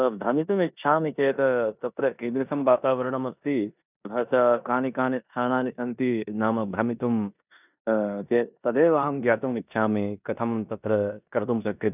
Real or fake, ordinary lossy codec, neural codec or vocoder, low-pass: fake; none; codec, 16 kHz in and 24 kHz out, 0.9 kbps, LongCat-Audio-Codec, four codebook decoder; 3.6 kHz